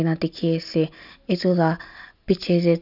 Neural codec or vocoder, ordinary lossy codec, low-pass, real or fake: none; none; 5.4 kHz; real